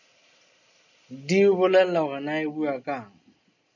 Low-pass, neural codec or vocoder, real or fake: 7.2 kHz; none; real